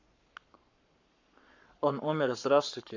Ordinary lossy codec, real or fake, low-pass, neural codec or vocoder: AAC, 48 kbps; fake; 7.2 kHz; codec, 44.1 kHz, 7.8 kbps, Pupu-Codec